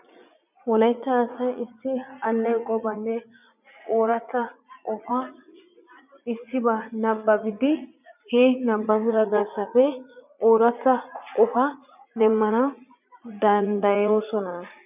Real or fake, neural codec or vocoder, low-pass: fake; vocoder, 44.1 kHz, 80 mel bands, Vocos; 3.6 kHz